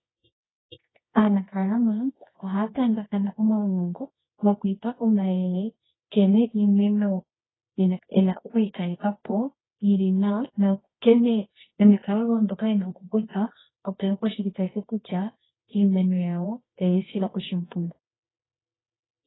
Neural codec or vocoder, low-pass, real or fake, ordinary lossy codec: codec, 24 kHz, 0.9 kbps, WavTokenizer, medium music audio release; 7.2 kHz; fake; AAC, 16 kbps